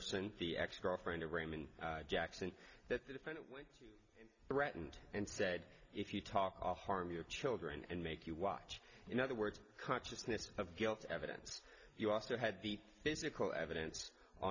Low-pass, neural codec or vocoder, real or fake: 7.2 kHz; none; real